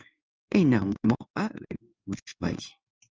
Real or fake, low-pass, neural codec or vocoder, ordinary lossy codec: fake; 7.2 kHz; codec, 16 kHz in and 24 kHz out, 1 kbps, XY-Tokenizer; Opus, 24 kbps